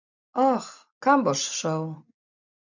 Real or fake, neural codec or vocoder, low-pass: real; none; 7.2 kHz